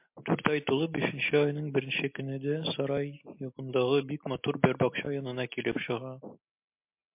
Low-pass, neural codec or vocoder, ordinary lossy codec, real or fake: 3.6 kHz; none; MP3, 32 kbps; real